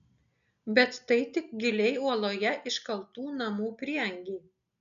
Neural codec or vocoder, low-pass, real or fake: none; 7.2 kHz; real